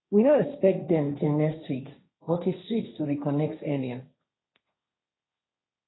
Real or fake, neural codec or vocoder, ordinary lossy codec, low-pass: fake; codec, 16 kHz, 1.1 kbps, Voila-Tokenizer; AAC, 16 kbps; 7.2 kHz